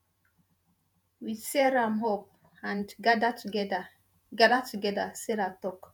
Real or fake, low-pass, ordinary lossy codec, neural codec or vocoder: fake; none; none; vocoder, 48 kHz, 128 mel bands, Vocos